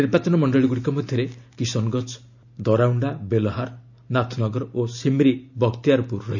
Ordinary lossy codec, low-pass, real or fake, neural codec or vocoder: none; 7.2 kHz; real; none